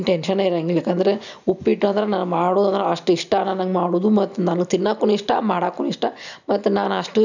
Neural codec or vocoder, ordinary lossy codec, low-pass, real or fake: none; none; 7.2 kHz; real